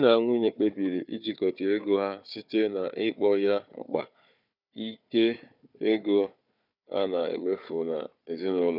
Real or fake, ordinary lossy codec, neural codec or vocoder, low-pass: fake; none; codec, 16 kHz, 4 kbps, FunCodec, trained on Chinese and English, 50 frames a second; 5.4 kHz